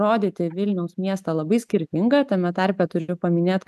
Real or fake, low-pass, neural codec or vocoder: real; 14.4 kHz; none